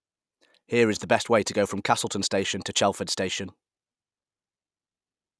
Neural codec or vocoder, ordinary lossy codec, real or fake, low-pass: none; none; real; none